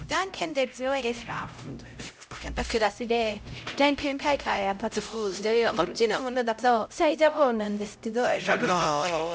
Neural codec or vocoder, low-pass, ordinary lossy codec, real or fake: codec, 16 kHz, 0.5 kbps, X-Codec, HuBERT features, trained on LibriSpeech; none; none; fake